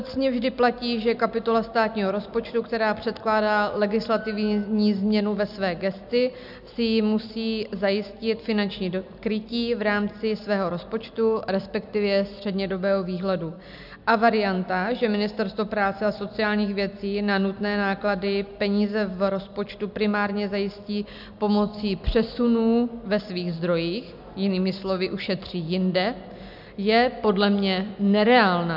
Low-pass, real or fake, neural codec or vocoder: 5.4 kHz; real; none